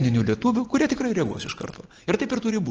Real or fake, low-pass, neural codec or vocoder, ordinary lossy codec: real; 7.2 kHz; none; Opus, 16 kbps